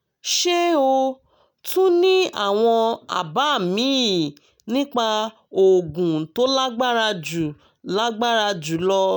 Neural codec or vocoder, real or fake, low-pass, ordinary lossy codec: none; real; 19.8 kHz; none